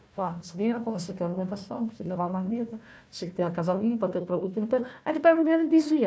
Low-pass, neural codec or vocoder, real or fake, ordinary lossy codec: none; codec, 16 kHz, 1 kbps, FunCodec, trained on Chinese and English, 50 frames a second; fake; none